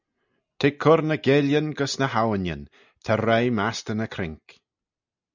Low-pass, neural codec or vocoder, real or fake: 7.2 kHz; none; real